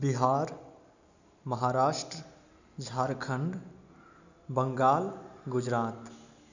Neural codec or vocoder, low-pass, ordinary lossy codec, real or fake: none; 7.2 kHz; none; real